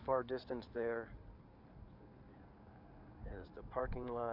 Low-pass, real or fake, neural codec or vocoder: 5.4 kHz; fake; codec, 16 kHz in and 24 kHz out, 2.2 kbps, FireRedTTS-2 codec